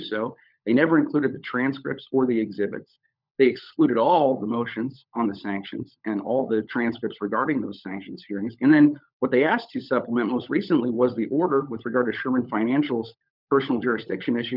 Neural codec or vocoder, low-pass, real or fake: codec, 16 kHz, 16 kbps, FunCodec, trained on LibriTTS, 50 frames a second; 5.4 kHz; fake